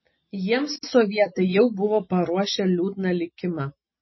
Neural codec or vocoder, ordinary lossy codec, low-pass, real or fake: none; MP3, 24 kbps; 7.2 kHz; real